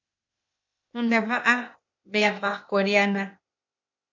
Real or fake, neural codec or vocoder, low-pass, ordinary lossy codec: fake; codec, 16 kHz, 0.8 kbps, ZipCodec; 7.2 kHz; MP3, 48 kbps